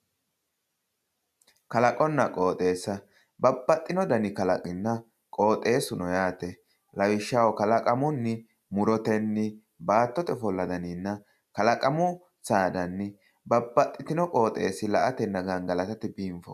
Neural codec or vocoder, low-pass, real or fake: none; 14.4 kHz; real